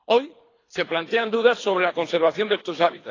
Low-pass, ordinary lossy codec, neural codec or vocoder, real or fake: 7.2 kHz; AAC, 32 kbps; codec, 24 kHz, 3 kbps, HILCodec; fake